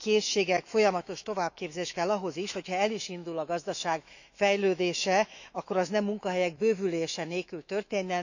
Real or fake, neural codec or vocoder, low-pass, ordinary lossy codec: fake; autoencoder, 48 kHz, 128 numbers a frame, DAC-VAE, trained on Japanese speech; 7.2 kHz; none